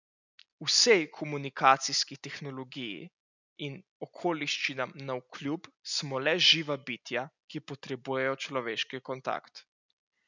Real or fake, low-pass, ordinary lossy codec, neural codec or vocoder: real; 7.2 kHz; none; none